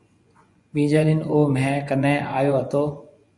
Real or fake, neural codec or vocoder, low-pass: fake; vocoder, 24 kHz, 100 mel bands, Vocos; 10.8 kHz